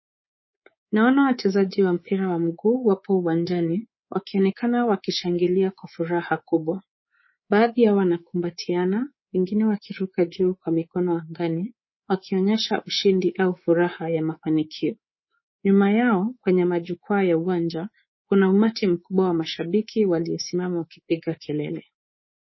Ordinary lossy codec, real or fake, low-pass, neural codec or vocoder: MP3, 24 kbps; fake; 7.2 kHz; codec, 24 kHz, 3.1 kbps, DualCodec